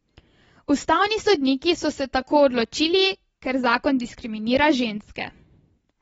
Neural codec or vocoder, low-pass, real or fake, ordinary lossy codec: none; 19.8 kHz; real; AAC, 24 kbps